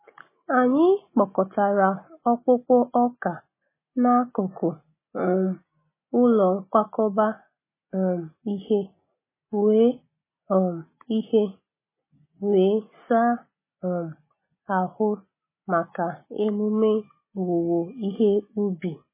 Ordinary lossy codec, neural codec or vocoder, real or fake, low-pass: MP3, 16 kbps; none; real; 3.6 kHz